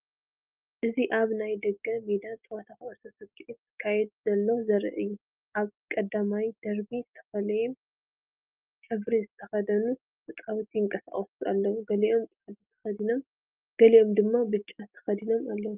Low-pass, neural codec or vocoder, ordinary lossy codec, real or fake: 3.6 kHz; none; Opus, 24 kbps; real